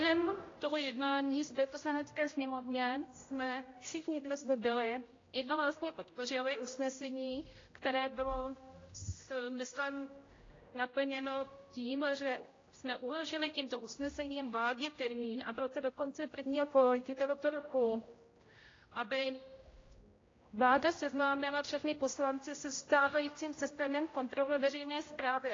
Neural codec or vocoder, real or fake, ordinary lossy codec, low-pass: codec, 16 kHz, 0.5 kbps, X-Codec, HuBERT features, trained on general audio; fake; AAC, 32 kbps; 7.2 kHz